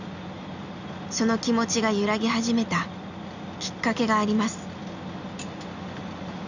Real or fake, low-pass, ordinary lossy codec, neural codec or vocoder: real; 7.2 kHz; none; none